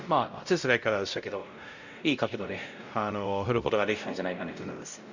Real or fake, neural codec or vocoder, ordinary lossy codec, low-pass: fake; codec, 16 kHz, 0.5 kbps, X-Codec, WavLM features, trained on Multilingual LibriSpeech; Opus, 64 kbps; 7.2 kHz